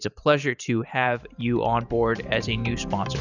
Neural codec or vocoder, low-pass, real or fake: vocoder, 44.1 kHz, 128 mel bands every 512 samples, BigVGAN v2; 7.2 kHz; fake